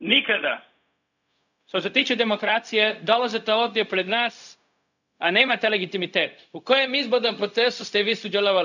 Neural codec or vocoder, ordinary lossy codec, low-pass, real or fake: codec, 16 kHz, 0.4 kbps, LongCat-Audio-Codec; none; 7.2 kHz; fake